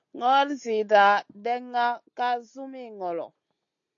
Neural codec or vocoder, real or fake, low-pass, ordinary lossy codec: none; real; 7.2 kHz; AAC, 48 kbps